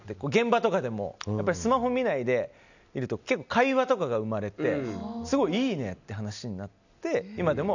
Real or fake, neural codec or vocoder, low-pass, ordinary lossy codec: real; none; 7.2 kHz; none